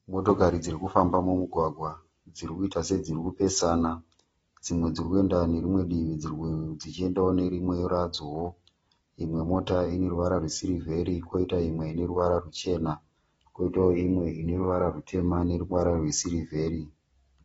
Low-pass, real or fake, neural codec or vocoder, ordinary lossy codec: 9.9 kHz; real; none; AAC, 24 kbps